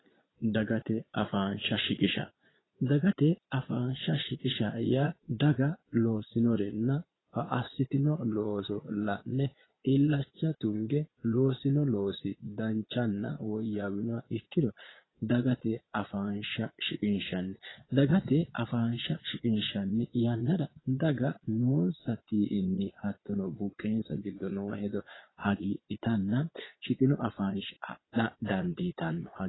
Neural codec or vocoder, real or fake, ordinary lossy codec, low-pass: vocoder, 22.05 kHz, 80 mel bands, Vocos; fake; AAC, 16 kbps; 7.2 kHz